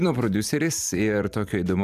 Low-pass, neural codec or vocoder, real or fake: 14.4 kHz; vocoder, 44.1 kHz, 128 mel bands every 512 samples, BigVGAN v2; fake